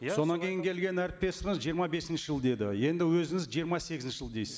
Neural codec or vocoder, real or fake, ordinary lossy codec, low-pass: none; real; none; none